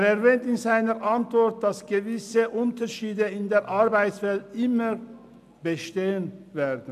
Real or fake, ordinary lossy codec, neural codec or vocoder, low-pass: real; none; none; 14.4 kHz